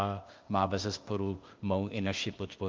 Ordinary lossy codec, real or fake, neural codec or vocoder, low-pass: Opus, 32 kbps; fake; codec, 16 kHz, 0.8 kbps, ZipCodec; 7.2 kHz